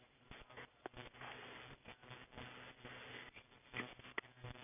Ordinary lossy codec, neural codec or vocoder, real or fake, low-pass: none; none; real; 3.6 kHz